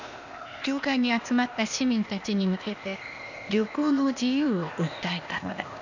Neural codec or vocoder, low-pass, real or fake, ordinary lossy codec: codec, 16 kHz, 0.8 kbps, ZipCodec; 7.2 kHz; fake; none